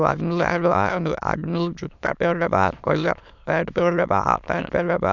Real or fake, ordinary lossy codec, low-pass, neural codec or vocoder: fake; none; 7.2 kHz; autoencoder, 22.05 kHz, a latent of 192 numbers a frame, VITS, trained on many speakers